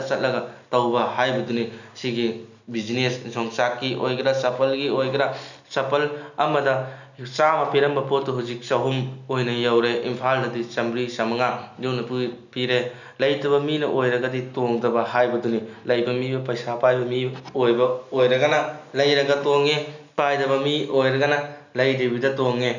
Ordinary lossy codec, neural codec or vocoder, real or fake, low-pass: none; none; real; 7.2 kHz